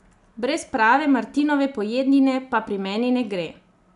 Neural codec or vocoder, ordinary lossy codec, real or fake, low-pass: none; none; real; 10.8 kHz